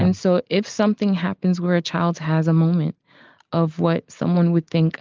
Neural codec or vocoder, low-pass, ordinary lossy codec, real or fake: none; 7.2 kHz; Opus, 24 kbps; real